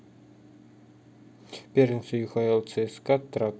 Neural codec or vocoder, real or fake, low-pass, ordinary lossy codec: none; real; none; none